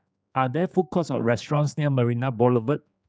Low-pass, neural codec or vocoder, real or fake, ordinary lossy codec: none; codec, 16 kHz, 4 kbps, X-Codec, HuBERT features, trained on general audio; fake; none